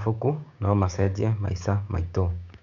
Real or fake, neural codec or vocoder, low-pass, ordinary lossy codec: fake; codec, 16 kHz, 6 kbps, DAC; 7.2 kHz; MP3, 96 kbps